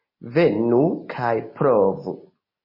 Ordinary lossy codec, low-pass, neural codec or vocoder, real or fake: MP3, 24 kbps; 5.4 kHz; none; real